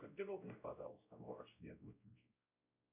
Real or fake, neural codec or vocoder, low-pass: fake; codec, 16 kHz, 0.5 kbps, X-Codec, WavLM features, trained on Multilingual LibriSpeech; 3.6 kHz